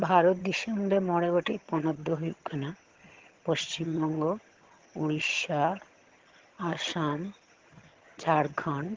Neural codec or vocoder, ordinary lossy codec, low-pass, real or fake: vocoder, 22.05 kHz, 80 mel bands, HiFi-GAN; Opus, 16 kbps; 7.2 kHz; fake